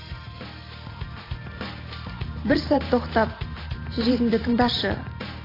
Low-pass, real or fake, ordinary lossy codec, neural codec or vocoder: 5.4 kHz; fake; AAC, 24 kbps; vocoder, 44.1 kHz, 128 mel bands every 512 samples, BigVGAN v2